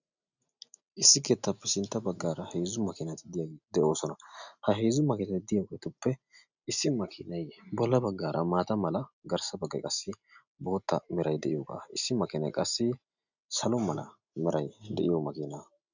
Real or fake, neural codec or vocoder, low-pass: real; none; 7.2 kHz